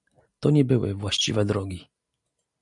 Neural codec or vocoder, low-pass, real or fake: none; 10.8 kHz; real